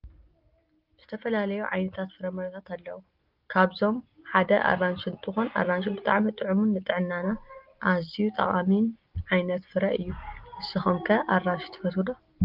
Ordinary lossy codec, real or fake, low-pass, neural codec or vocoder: Opus, 24 kbps; real; 5.4 kHz; none